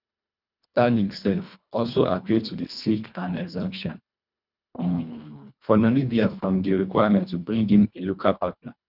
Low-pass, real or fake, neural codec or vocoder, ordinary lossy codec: 5.4 kHz; fake; codec, 24 kHz, 1.5 kbps, HILCodec; none